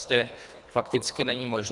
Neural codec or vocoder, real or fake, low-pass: codec, 24 kHz, 1.5 kbps, HILCodec; fake; 10.8 kHz